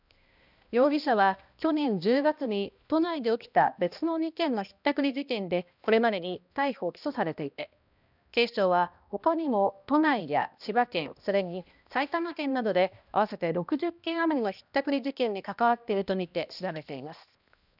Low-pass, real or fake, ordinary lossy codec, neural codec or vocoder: 5.4 kHz; fake; none; codec, 16 kHz, 1 kbps, X-Codec, HuBERT features, trained on balanced general audio